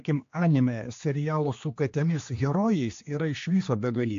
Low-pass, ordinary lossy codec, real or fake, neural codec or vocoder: 7.2 kHz; AAC, 48 kbps; fake; codec, 16 kHz, 2 kbps, X-Codec, HuBERT features, trained on general audio